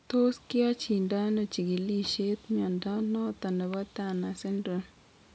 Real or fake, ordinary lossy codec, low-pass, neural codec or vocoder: real; none; none; none